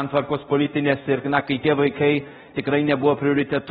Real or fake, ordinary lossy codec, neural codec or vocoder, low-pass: fake; AAC, 16 kbps; codec, 24 kHz, 0.5 kbps, DualCodec; 10.8 kHz